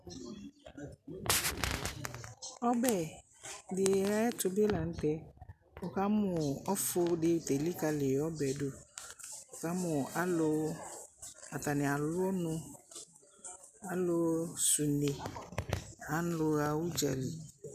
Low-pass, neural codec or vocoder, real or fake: 14.4 kHz; none; real